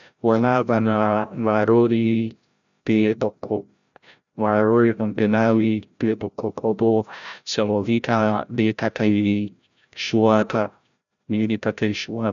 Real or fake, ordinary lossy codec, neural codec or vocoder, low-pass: fake; none; codec, 16 kHz, 0.5 kbps, FreqCodec, larger model; 7.2 kHz